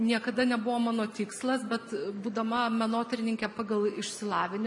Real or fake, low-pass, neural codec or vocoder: real; 10.8 kHz; none